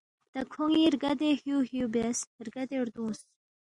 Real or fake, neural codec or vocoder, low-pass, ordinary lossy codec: real; none; 10.8 kHz; Opus, 64 kbps